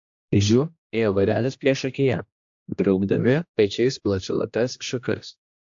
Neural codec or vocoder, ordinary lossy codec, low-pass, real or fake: codec, 16 kHz, 1 kbps, X-Codec, HuBERT features, trained on general audio; AAC, 64 kbps; 7.2 kHz; fake